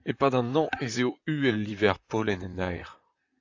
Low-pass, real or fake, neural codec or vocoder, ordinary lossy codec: 7.2 kHz; fake; vocoder, 44.1 kHz, 128 mel bands, Pupu-Vocoder; AAC, 48 kbps